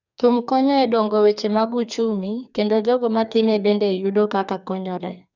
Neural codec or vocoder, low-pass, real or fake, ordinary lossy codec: codec, 44.1 kHz, 2.6 kbps, SNAC; 7.2 kHz; fake; none